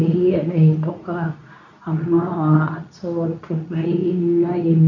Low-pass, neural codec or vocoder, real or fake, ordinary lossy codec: 7.2 kHz; codec, 24 kHz, 0.9 kbps, WavTokenizer, medium speech release version 2; fake; none